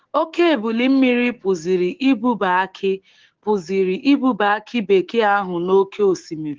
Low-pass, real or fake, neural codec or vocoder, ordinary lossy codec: 7.2 kHz; fake; codec, 16 kHz, 6 kbps, DAC; Opus, 16 kbps